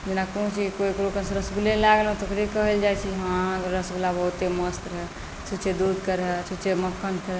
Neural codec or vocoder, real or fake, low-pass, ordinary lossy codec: none; real; none; none